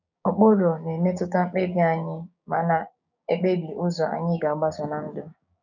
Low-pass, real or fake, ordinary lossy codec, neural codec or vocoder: 7.2 kHz; fake; none; codec, 16 kHz, 6 kbps, DAC